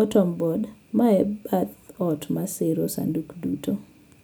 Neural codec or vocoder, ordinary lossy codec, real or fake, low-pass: none; none; real; none